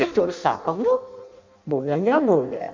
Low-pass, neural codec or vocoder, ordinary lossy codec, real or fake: 7.2 kHz; codec, 16 kHz in and 24 kHz out, 0.6 kbps, FireRedTTS-2 codec; MP3, 48 kbps; fake